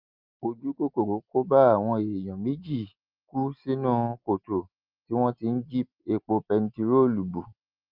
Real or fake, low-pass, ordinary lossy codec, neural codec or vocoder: real; 5.4 kHz; Opus, 32 kbps; none